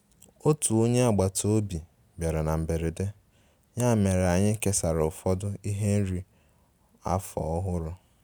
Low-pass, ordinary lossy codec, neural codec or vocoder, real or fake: none; none; none; real